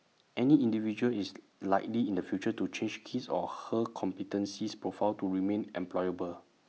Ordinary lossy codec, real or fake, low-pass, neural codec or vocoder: none; real; none; none